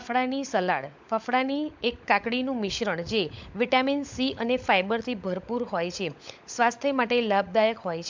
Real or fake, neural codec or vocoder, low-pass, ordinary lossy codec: fake; codec, 16 kHz, 16 kbps, FunCodec, trained on LibriTTS, 50 frames a second; 7.2 kHz; MP3, 64 kbps